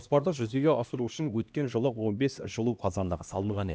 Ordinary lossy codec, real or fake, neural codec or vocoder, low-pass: none; fake; codec, 16 kHz, 1 kbps, X-Codec, HuBERT features, trained on LibriSpeech; none